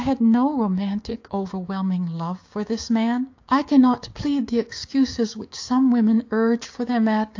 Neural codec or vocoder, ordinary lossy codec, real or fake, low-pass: codec, 16 kHz, 6 kbps, DAC; AAC, 48 kbps; fake; 7.2 kHz